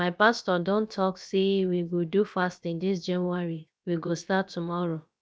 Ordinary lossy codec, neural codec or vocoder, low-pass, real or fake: none; codec, 16 kHz, about 1 kbps, DyCAST, with the encoder's durations; none; fake